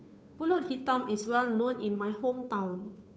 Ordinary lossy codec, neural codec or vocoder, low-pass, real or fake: none; codec, 16 kHz, 2 kbps, FunCodec, trained on Chinese and English, 25 frames a second; none; fake